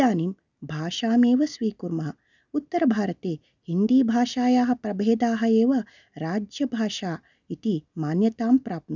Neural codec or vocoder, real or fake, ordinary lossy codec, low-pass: none; real; none; 7.2 kHz